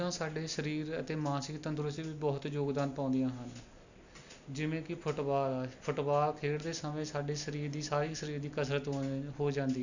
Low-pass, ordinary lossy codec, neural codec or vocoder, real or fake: 7.2 kHz; none; none; real